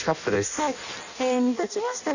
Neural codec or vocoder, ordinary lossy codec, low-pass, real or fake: codec, 16 kHz in and 24 kHz out, 0.6 kbps, FireRedTTS-2 codec; none; 7.2 kHz; fake